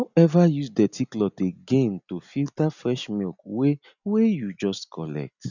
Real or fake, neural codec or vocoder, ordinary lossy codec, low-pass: real; none; none; 7.2 kHz